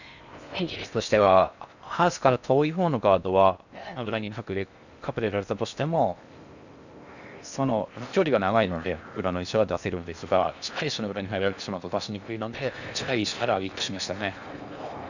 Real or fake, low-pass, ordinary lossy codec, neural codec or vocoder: fake; 7.2 kHz; none; codec, 16 kHz in and 24 kHz out, 0.6 kbps, FocalCodec, streaming, 4096 codes